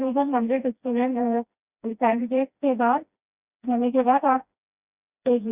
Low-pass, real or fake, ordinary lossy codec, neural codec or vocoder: 3.6 kHz; fake; Opus, 64 kbps; codec, 16 kHz, 1 kbps, FreqCodec, smaller model